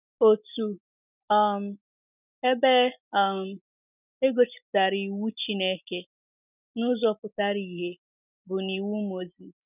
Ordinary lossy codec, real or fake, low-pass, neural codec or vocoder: none; real; 3.6 kHz; none